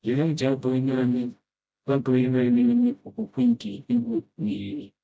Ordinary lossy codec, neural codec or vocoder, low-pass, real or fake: none; codec, 16 kHz, 0.5 kbps, FreqCodec, smaller model; none; fake